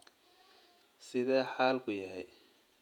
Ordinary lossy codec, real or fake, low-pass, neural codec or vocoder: none; fake; 19.8 kHz; vocoder, 48 kHz, 128 mel bands, Vocos